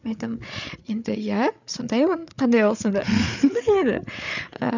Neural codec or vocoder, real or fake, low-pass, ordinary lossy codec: codec, 16 kHz, 8 kbps, FreqCodec, larger model; fake; 7.2 kHz; none